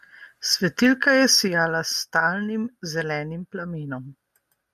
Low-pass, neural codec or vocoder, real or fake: 14.4 kHz; none; real